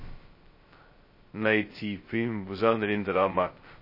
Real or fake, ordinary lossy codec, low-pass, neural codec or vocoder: fake; MP3, 24 kbps; 5.4 kHz; codec, 16 kHz, 0.2 kbps, FocalCodec